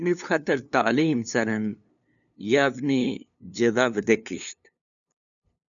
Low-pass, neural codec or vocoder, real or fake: 7.2 kHz; codec, 16 kHz, 2 kbps, FunCodec, trained on LibriTTS, 25 frames a second; fake